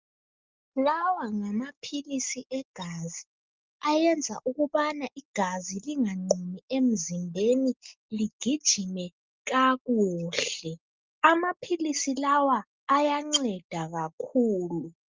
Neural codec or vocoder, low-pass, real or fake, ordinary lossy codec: none; 7.2 kHz; real; Opus, 24 kbps